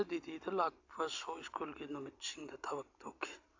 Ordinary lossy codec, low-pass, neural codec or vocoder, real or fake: AAC, 48 kbps; 7.2 kHz; none; real